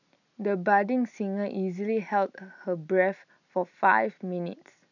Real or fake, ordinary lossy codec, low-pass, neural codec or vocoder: real; none; 7.2 kHz; none